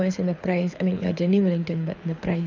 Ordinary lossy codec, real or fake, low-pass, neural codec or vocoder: none; fake; 7.2 kHz; codec, 24 kHz, 6 kbps, HILCodec